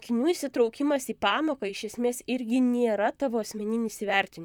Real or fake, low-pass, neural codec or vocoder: fake; 19.8 kHz; vocoder, 44.1 kHz, 128 mel bands, Pupu-Vocoder